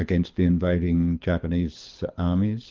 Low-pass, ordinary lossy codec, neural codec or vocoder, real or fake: 7.2 kHz; Opus, 32 kbps; codec, 24 kHz, 6 kbps, HILCodec; fake